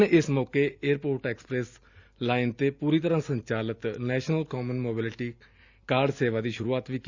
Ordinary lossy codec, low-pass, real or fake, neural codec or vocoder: Opus, 64 kbps; 7.2 kHz; real; none